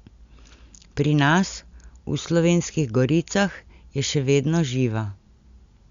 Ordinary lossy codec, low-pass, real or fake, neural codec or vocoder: Opus, 64 kbps; 7.2 kHz; real; none